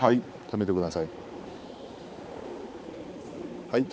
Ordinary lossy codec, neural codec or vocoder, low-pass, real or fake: none; codec, 16 kHz, 4 kbps, X-Codec, HuBERT features, trained on balanced general audio; none; fake